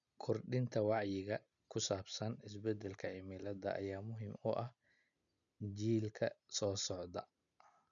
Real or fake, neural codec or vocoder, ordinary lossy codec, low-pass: real; none; MP3, 96 kbps; 7.2 kHz